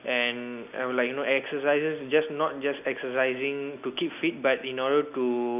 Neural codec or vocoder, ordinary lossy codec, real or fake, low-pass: none; none; real; 3.6 kHz